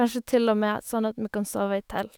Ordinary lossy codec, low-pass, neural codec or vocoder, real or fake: none; none; autoencoder, 48 kHz, 128 numbers a frame, DAC-VAE, trained on Japanese speech; fake